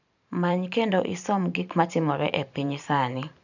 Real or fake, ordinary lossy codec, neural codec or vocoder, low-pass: fake; none; vocoder, 44.1 kHz, 128 mel bands every 512 samples, BigVGAN v2; 7.2 kHz